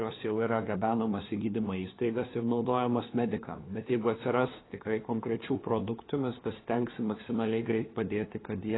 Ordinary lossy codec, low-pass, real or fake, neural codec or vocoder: AAC, 16 kbps; 7.2 kHz; fake; codec, 16 kHz, 2 kbps, FunCodec, trained on LibriTTS, 25 frames a second